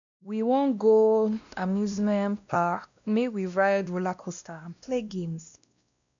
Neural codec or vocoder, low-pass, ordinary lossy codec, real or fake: codec, 16 kHz, 1 kbps, X-Codec, WavLM features, trained on Multilingual LibriSpeech; 7.2 kHz; MP3, 96 kbps; fake